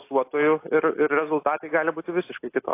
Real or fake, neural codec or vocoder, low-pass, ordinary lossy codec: real; none; 3.6 kHz; AAC, 24 kbps